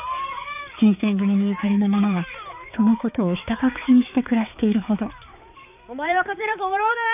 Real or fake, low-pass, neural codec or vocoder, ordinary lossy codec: fake; 3.6 kHz; codec, 16 kHz, 4 kbps, X-Codec, HuBERT features, trained on balanced general audio; none